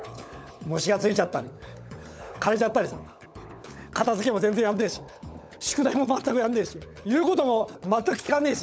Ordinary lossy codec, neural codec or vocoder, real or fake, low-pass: none; codec, 16 kHz, 16 kbps, FunCodec, trained on LibriTTS, 50 frames a second; fake; none